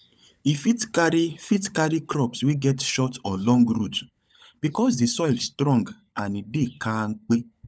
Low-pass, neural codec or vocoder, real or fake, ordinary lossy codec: none; codec, 16 kHz, 16 kbps, FunCodec, trained on LibriTTS, 50 frames a second; fake; none